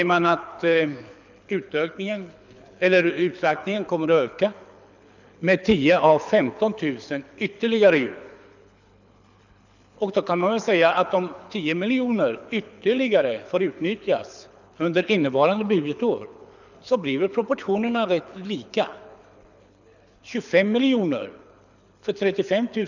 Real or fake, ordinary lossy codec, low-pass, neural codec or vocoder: fake; none; 7.2 kHz; codec, 24 kHz, 6 kbps, HILCodec